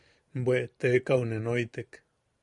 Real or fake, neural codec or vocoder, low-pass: fake; vocoder, 44.1 kHz, 128 mel bands every 512 samples, BigVGAN v2; 10.8 kHz